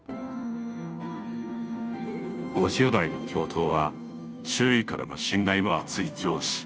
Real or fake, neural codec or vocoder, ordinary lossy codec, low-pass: fake; codec, 16 kHz, 0.5 kbps, FunCodec, trained on Chinese and English, 25 frames a second; none; none